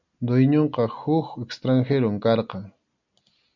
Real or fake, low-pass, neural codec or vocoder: real; 7.2 kHz; none